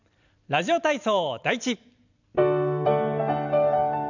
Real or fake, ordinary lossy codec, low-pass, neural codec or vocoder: fake; none; 7.2 kHz; vocoder, 44.1 kHz, 128 mel bands every 512 samples, BigVGAN v2